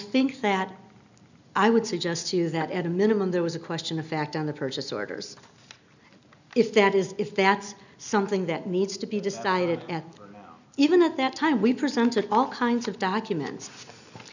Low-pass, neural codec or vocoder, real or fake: 7.2 kHz; none; real